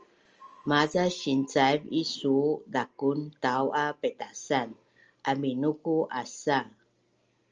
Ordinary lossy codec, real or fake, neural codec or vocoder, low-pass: Opus, 24 kbps; real; none; 7.2 kHz